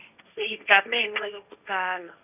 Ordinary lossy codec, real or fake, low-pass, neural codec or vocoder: none; fake; 3.6 kHz; codec, 16 kHz, 1.1 kbps, Voila-Tokenizer